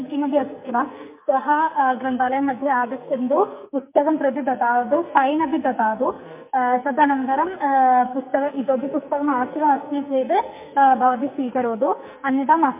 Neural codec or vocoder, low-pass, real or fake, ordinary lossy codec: codec, 44.1 kHz, 2.6 kbps, SNAC; 3.6 kHz; fake; MP3, 32 kbps